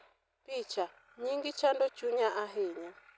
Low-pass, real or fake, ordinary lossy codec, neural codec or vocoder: none; real; none; none